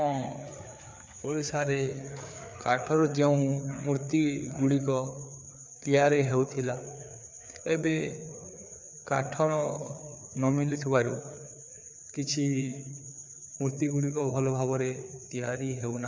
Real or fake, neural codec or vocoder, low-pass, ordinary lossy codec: fake; codec, 16 kHz, 4 kbps, FreqCodec, larger model; none; none